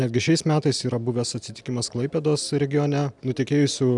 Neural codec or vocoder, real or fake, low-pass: none; real; 10.8 kHz